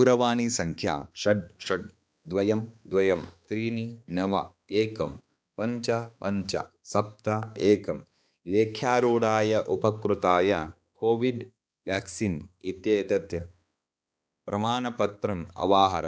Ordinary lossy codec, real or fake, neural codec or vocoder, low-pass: none; fake; codec, 16 kHz, 2 kbps, X-Codec, HuBERT features, trained on balanced general audio; none